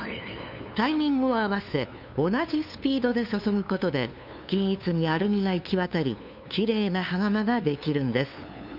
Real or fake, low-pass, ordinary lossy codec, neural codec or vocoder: fake; 5.4 kHz; none; codec, 16 kHz, 2 kbps, FunCodec, trained on LibriTTS, 25 frames a second